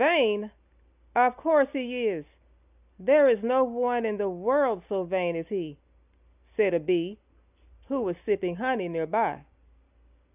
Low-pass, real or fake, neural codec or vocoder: 3.6 kHz; real; none